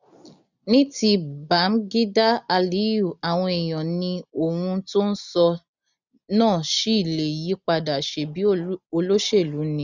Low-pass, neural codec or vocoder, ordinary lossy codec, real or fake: 7.2 kHz; none; none; real